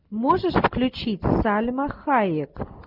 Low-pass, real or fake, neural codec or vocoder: 5.4 kHz; real; none